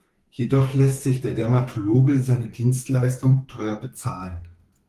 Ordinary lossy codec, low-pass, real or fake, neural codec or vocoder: Opus, 24 kbps; 14.4 kHz; fake; codec, 32 kHz, 1.9 kbps, SNAC